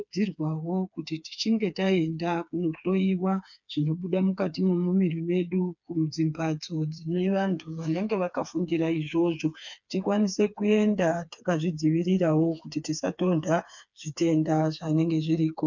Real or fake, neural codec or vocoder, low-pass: fake; codec, 16 kHz, 4 kbps, FreqCodec, smaller model; 7.2 kHz